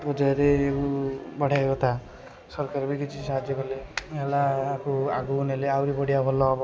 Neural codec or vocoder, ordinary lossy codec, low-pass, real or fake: none; none; none; real